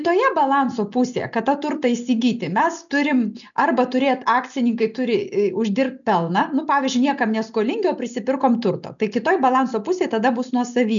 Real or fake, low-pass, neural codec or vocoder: real; 7.2 kHz; none